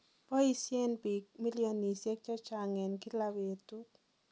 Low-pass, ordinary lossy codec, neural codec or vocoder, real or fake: none; none; none; real